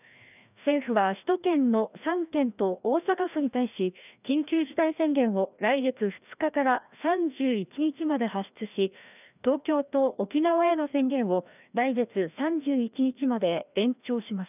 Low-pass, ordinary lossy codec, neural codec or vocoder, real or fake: 3.6 kHz; none; codec, 16 kHz, 1 kbps, FreqCodec, larger model; fake